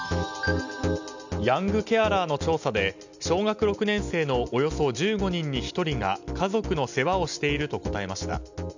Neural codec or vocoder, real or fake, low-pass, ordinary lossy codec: none; real; 7.2 kHz; none